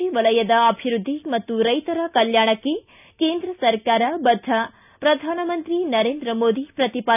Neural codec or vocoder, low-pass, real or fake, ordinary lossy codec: none; 3.6 kHz; real; none